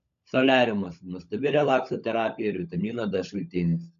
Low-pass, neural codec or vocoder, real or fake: 7.2 kHz; codec, 16 kHz, 16 kbps, FunCodec, trained on LibriTTS, 50 frames a second; fake